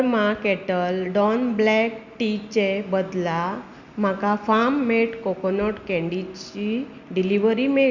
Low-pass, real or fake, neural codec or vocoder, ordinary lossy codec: 7.2 kHz; real; none; Opus, 64 kbps